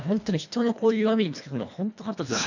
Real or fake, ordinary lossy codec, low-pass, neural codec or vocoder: fake; none; 7.2 kHz; codec, 24 kHz, 1.5 kbps, HILCodec